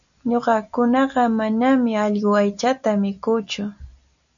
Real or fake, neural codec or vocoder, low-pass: real; none; 7.2 kHz